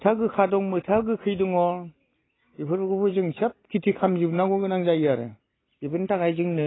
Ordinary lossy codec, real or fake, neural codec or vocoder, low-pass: AAC, 16 kbps; real; none; 7.2 kHz